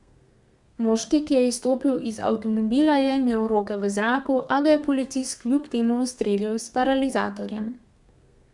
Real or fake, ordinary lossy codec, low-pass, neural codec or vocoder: fake; MP3, 96 kbps; 10.8 kHz; codec, 32 kHz, 1.9 kbps, SNAC